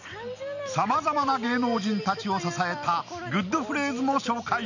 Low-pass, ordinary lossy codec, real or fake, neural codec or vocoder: 7.2 kHz; none; real; none